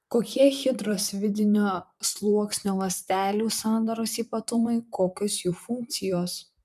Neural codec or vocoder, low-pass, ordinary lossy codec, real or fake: vocoder, 44.1 kHz, 128 mel bands, Pupu-Vocoder; 14.4 kHz; MP3, 96 kbps; fake